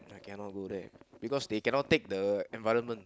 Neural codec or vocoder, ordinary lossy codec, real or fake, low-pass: none; none; real; none